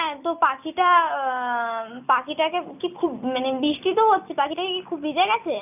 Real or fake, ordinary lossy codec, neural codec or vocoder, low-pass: real; none; none; 3.6 kHz